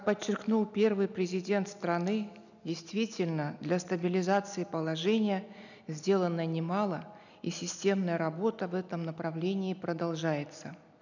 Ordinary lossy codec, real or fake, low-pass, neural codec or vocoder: none; real; 7.2 kHz; none